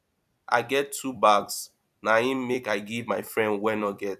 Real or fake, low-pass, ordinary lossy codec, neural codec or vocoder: fake; 14.4 kHz; none; vocoder, 44.1 kHz, 128 mel bands every 256 samples, BigVGAN v2